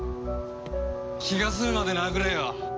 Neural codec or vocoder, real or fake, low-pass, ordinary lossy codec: none; real; none; none